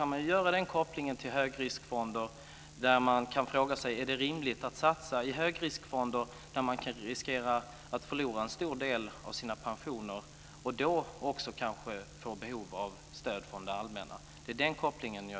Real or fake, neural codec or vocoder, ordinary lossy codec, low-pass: real; none; none; none